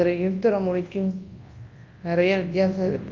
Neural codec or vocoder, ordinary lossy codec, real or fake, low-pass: codec, 24 kHz, 0.9 kbps, WavTokenizer, large speech release; Opus, 32 kbps; fake; 7.2 kHz